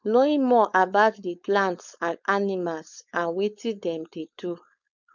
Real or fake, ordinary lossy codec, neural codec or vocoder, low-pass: fake; none; codec, 16 kHz, 4.8 kbps, FACodec; 7.2 kHz